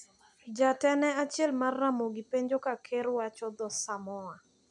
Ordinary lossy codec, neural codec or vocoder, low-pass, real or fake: none; none; 10.8 kHz; real